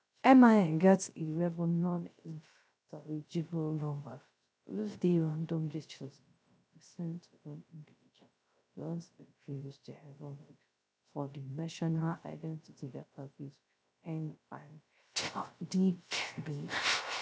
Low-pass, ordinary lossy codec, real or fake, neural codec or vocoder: none; none; fake; codec, 16 kHz, 0.3 kbps, FocalCodec